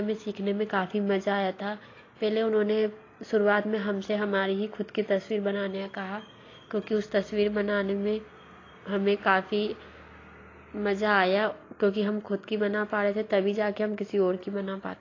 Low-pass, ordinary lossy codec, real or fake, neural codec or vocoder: 7.2 kHz; AAC, 32 kbps; real; none